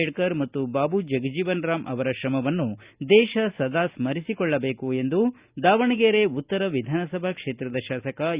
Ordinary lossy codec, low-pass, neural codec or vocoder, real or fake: Opus, 64 kbps; 3.6 kHz; none; real